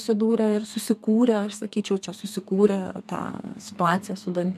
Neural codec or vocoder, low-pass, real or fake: codec, 32 kHz, 1.9 kbps, SNAC; 14.4 kHz; fake